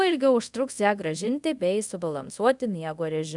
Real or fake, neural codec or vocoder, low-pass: fake; codec, 24 kHz, 0.5 kbps, DualCodec; 10.8 kHz